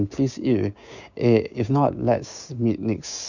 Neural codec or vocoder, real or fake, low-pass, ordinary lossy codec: codec, 16 kHz, 6 kbps, DAC; fake; 7.2 kHz; none